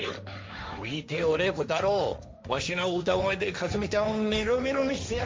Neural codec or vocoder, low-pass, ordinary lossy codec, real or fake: codec, 16 kHz, 1.1 kbps, Voila-Tokenizer; none; none; fake